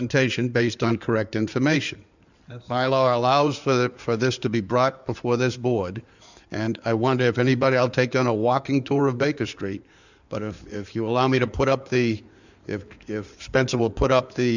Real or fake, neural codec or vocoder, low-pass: fake; codec, 16 kHz in and 24 kHz out, 2.2 kbps, FireRedTTS-2 codec; 7.2 kHz